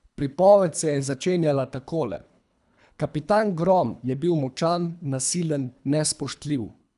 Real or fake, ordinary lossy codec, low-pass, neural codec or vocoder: fake; none; 10.8 kHz; codec, 24 kHz, 3 kbps, HILCodec